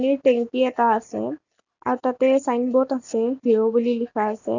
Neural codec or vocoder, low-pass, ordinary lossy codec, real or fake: none; 7.2 kHz; none; real